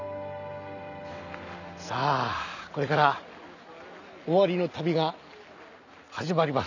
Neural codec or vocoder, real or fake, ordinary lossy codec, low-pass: none; real; none; 7.2 kHz